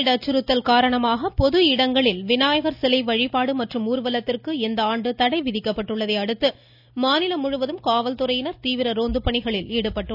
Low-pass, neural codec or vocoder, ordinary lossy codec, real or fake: 5.4 kHz; none; none; real